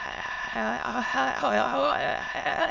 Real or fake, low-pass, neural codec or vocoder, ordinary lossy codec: fake; 7.2 kHz; autoencoder, 22.05 kHz, a latent of 192 numbers a frame, VITS, trained on many speakers; none